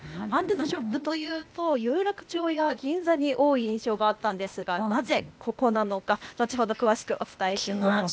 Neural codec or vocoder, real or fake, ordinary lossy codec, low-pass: codec, 16 kHz, 0.8 kbps, ZipCodec; fake; none; none